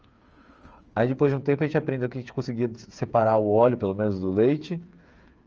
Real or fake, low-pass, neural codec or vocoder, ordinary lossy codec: fake; 7.2 kHz; codec, 16 kHz, 8 kbps, FreqCodec, smaller model; Opus, 24 kbps